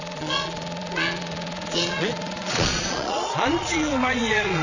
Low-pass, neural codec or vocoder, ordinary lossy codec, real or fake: 7.2 kHz; vocoder, 22.05 kHz, 80 mel bands, Vocos; none; fake